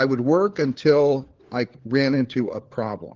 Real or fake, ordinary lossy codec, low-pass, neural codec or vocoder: fake; Opus, 16 kbps; 7.2 kHz; codec, 16 kHz, 4 kbps, FunCodec, trained on LibriTTS, 50 frames a second